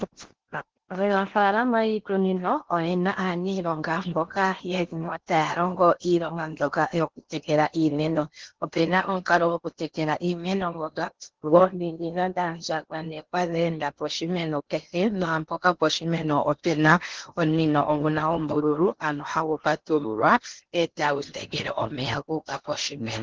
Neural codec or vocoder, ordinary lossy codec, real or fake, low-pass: codec, 16 kHz in and 24 kHz out, 0.8 kbps, FocalCodec, streaming, 65536 codes; Opus, 16 kbps; fake; 7.2 kHz